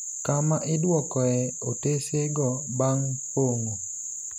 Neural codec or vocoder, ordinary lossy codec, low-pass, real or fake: none; none; 19.8 kHz; real